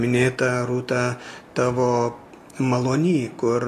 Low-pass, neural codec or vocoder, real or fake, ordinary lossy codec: 14.4 kHz; none; real; AAC, 48 kbps